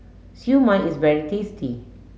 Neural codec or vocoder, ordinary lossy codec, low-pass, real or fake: none; none; none; real